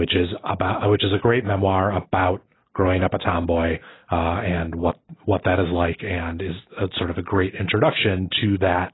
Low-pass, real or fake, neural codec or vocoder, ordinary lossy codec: 7.2 kHz; real; none; AAC, 16 kbps